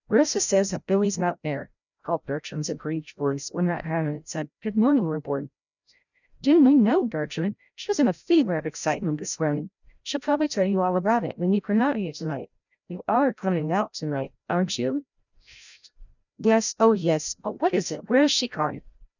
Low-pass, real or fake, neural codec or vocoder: 7.2 kHz; fake; codec, 16 kHz, 0.5 kbps, FreqCodec, larger model